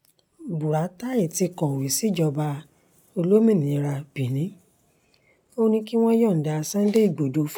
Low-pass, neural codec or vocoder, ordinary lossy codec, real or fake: none; none; none; real